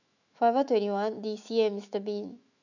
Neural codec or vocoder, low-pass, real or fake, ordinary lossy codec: autoencoder, 48 kHz, 128 numbers a frame, DAC-VAE, trained on Japanese speech; 7.2 kHz; fake; none